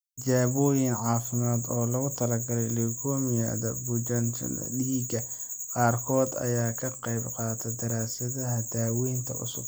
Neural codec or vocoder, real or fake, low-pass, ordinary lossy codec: none; real; none; none